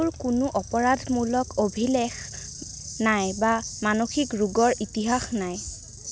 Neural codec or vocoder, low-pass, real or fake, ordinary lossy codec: none; none; real; none